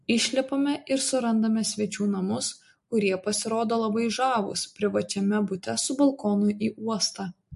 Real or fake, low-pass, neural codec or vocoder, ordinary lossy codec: real; 14.4 kHz; none; MP3, 48 kbps